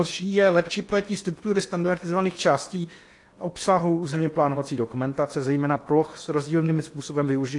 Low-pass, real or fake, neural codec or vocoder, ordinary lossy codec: 10.8 kHz; fake; codec, 16 kHz in and 24 kHz out, 0.8 kbps, FocalCodec, streaming, 65536 codes; AAC, 48 kbps